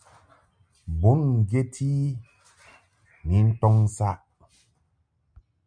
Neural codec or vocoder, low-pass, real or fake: none; 9.9 kHz; real